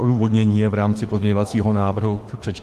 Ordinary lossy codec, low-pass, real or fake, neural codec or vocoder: Opus, 24 kbps; 14.4 kHz; fake; autoencoder, 48 kHz, 32 numbers a frame, DAC-VAE, trained on Japanese speech